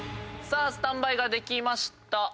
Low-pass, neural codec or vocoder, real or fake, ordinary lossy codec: none; none; real; none